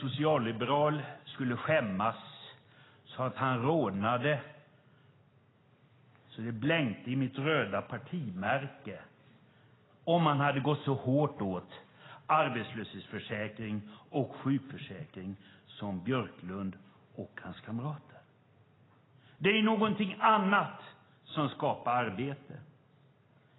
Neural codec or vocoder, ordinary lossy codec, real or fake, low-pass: none; AAC, 16 kbps; real; 7.2 kHz